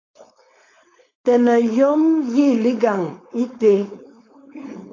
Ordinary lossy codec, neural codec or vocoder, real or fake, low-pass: AAC, 32 kbps; codec, 16 kHz, 4.8 kbps, FACodec; fake; 7.2 kHz